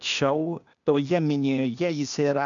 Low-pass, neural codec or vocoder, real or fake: 7.2 kHz; codec, 16 kHz, 0.8 kbps, ZipCodec; fake